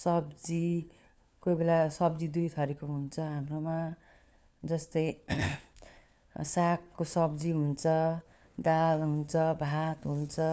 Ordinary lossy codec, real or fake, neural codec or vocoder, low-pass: none; fake; codec, 16 kHz, 4 kbps, FunCodec, trained on LibriTTS, 50 frames a second; none